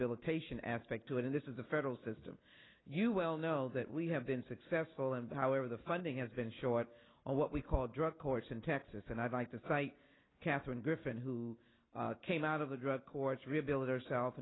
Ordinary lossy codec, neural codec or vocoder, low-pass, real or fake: AAC, 16 kbps; none; 7.2 kHz; real